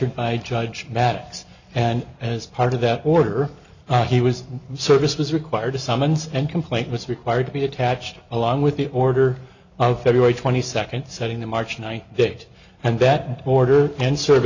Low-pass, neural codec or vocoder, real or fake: 7.2 kHz; none; real